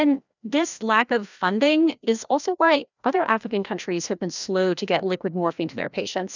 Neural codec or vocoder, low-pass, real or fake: codec, 16 kHz, 1 kbps, FreqCodec, larger model; 7.2 kHz; fake